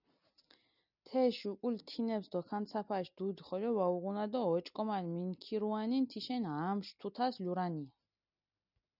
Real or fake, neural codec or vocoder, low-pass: real; none; 5.4 kHz